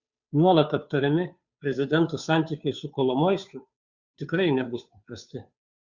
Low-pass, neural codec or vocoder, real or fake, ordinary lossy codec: 7.2 kHz; codec, 16 kHz, 2 kbps, FunCodec, trained on Chinese and English, 25 frames a second; fake; Opus, 64 kbps